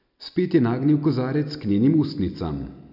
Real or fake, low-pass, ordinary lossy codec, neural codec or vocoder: real; 5.4 kHz; none; none